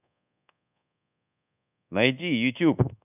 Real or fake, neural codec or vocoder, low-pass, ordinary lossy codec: fake; codec, 24 kHz, 1.2 kbps, DualCodec; 3.6 kHz; none